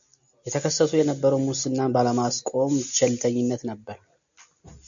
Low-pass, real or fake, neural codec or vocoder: 7.2 kHz; real; none